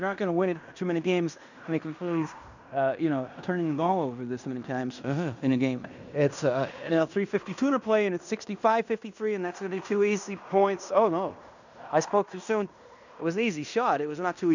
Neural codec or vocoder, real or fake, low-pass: codec, 16 kHz in and 24 kHz out, 0.9 kbps, LongCat-Audio-Codec, fine tuned four codebook decoder; fake; 7.2 kHz